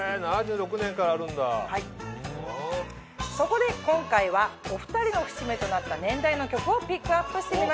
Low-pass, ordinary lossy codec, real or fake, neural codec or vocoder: none; none; real; none